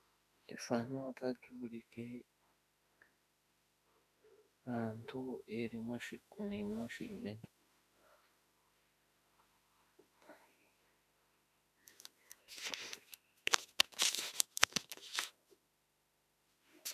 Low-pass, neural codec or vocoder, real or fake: 14.4 kHz; autoencoder, 48 kHz, 32 numbers a frame, DAC-VAE, trained on Japanese speech; fake